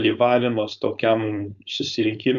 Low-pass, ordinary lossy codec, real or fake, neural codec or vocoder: 7.2 kHz; Opus, 64 kbps; fake; codec, 16 kHz, 4.8 kbps, FACodec